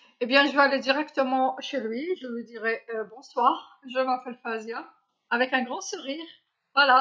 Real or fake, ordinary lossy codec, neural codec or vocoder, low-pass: real; none; none; none